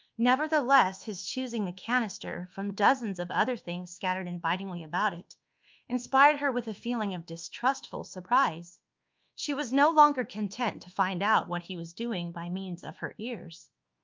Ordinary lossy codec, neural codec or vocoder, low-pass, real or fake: Opus, 24 kbps; codec, 24 kHz, 1.2 kbps, DualCodec; 7.2 kHz; fake